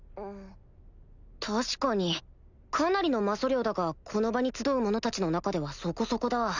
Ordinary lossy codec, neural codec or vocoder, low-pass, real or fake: none; none; 7.2 kHz; real